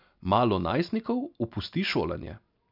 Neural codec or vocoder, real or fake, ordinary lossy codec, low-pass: none; real; none; 5.4 kHz